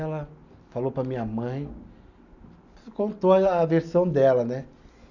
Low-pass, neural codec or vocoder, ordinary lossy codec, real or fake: 7.2 kHz; none; none; real